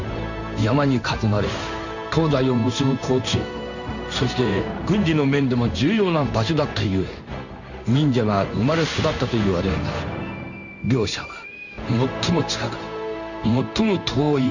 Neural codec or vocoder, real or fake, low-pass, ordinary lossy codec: codec, 16 kHz in and 24 kHz out, 1 kbps, XY-Tokenizer; fake; 7.2 kHz; none